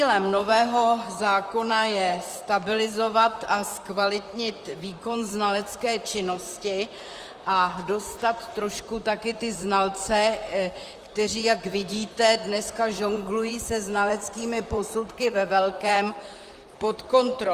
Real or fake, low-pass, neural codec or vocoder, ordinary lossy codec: fake; 14.4 kHz; vocoder, 44.1 kHz, 128 mel bands, Pupu-Vocoder; Opus, 32 kbps